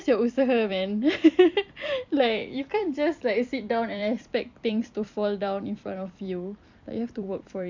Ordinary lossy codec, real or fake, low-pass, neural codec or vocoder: MP3, 64 kbps; fake; 7.2 kHz; vocoder, 44.1 kHz, 128 mel bands every 256 samples, BigVGAN v2